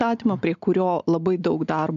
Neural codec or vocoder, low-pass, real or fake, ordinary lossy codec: none; 7.2 kHz; real; AAC, 96 kbps